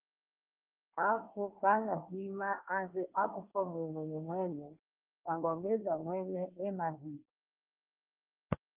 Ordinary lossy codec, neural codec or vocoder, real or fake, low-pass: Opus, 24 kbps; codec, 24 kHz, 1 kbps, SNAC; fake; 3.6 kHz